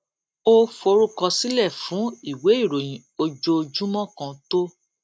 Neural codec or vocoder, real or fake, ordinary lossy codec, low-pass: none; real; none; none